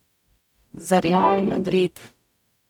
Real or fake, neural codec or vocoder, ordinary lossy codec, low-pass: fake; codec, 44.1 kHz, 0.9 kbps, DAC; none; 19.8 kHz